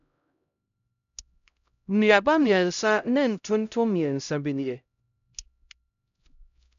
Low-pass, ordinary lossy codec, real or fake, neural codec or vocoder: 7.2 kHz; none; fake; codec, 16 kHz, 0.5 kbps, X-Codec, HuBERT features, trained on LibriSpeech